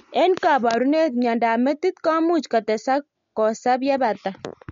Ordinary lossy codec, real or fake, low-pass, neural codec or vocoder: MP3, 48 kbps; real; 7.2 kHz; none